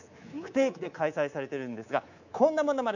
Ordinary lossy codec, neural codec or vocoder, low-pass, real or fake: none; codec, 24 kHz, 3.1 kbps, DualCodec; 7.2 kHz; fake